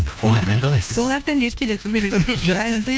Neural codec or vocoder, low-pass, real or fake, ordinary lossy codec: codec, 16 kHz, 1 kbps, FunCodec, trained on LibriTTS, 50 frames a second; none; fake; none